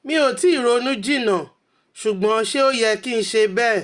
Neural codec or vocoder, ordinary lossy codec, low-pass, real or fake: none; Opus, 64 kbps; 10.8 kHz; real